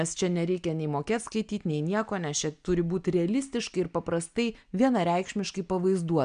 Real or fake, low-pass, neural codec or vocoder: real; 9.9 kHz; none